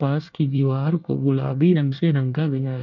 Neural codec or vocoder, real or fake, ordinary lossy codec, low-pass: codec, 24 kHz, 1 kbps, SNAC; fake; MP3, 64 kbps; 7.2 kHz